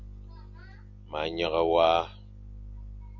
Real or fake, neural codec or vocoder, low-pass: real; none; 7.2 kHz